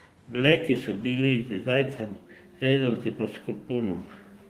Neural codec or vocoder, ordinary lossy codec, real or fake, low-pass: codec, 32 kHz, 1.9 kbps, SNAC; Opus, 32 kbps; fake; 14.4 kHz